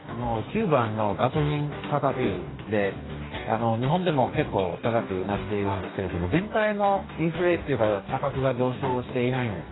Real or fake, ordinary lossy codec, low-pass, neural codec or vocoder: fake; AAC, 16 kbps; 7.2 kHz; codec, 44.1 kHz, 2.6 kbps, DAC